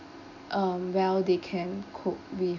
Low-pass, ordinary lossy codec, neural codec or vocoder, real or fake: 7.2 kHz; none; none; real